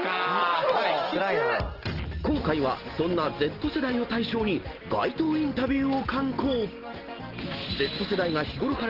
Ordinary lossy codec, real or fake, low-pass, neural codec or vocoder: Opus, 16 kbps; real; 5.4 kHz; none